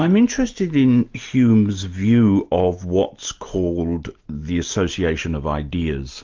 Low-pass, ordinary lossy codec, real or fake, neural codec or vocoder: 7.2 kHz; Opus, 24 kbps; real; none